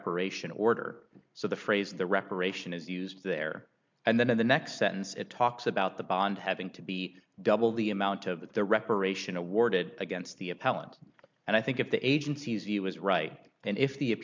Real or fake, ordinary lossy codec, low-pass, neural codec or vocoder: real; MP3, 64 kbps; 7.2 kHz; none